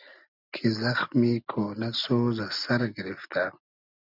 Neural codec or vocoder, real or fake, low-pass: none; real; 5.4 kHz